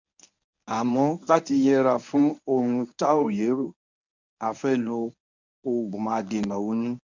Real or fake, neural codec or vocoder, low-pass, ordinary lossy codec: fake; codec, 24 kHz, 0.9 kbps, WavTokenizer, medium speech release version 1; 7.2 kHz; none